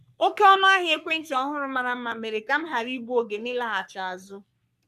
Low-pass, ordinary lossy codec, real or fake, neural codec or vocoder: 14.4 kHz; none; fake; codec, 44.1 kHz, 3.4 kbps, Pupu-Codec